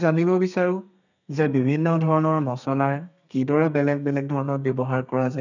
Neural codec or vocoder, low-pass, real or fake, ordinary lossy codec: codec, 32 kHz, 1.9 kbps, SNAC; 7.2 kHz; fake; none